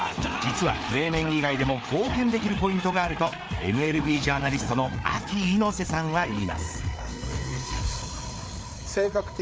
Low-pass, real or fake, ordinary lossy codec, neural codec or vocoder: none; fake; none; codec, 16 kHz, 4 kbps, FreqCodec, larger model